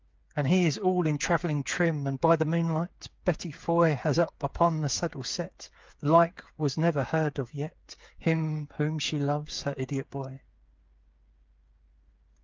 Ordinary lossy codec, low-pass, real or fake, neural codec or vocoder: Opus, 32 kbps; 7.2 kHz; fake; codec, 16 kHz, 8 kbps, FreqCodec, smaller model